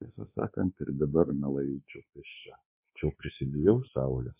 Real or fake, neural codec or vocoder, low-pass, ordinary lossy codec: fake; codec, 24 kHz, 1.2 kbps, DualCodec; 3.6 kHz; MP3, 24 kbps